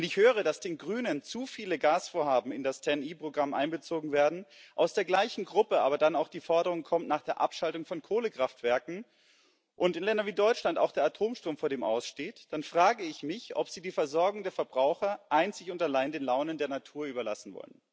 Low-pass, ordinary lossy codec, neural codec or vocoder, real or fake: none; none; none; real